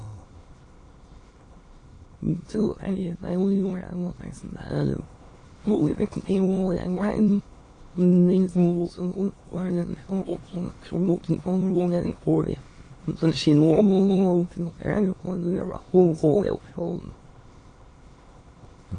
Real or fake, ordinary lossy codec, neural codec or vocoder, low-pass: fake; AAC, 32 kbps; autoencoder, 22.05 kHz, a latent of 192 numbers a frame, VITS, trained on many speakers; 9.9 kHz